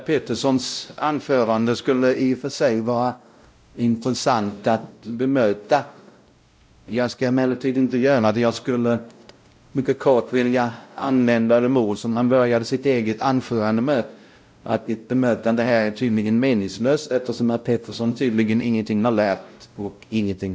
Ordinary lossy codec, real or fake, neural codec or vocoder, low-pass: none; fake; codec, 16 kHz, 0.5 kbps, X-Codec, WavLM features, trained on Multilingual LibriSpeech; none